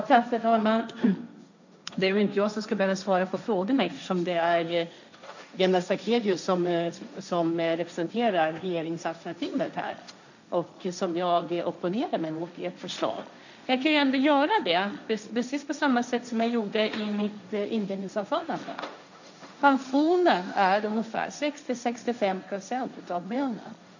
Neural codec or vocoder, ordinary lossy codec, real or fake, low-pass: codec, 16 kHz, 1.1 kbps, Voila-Tokenizer; none; fake; 7.2 kHz